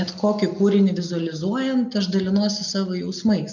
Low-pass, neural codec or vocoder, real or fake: 7.2 kHz; none; real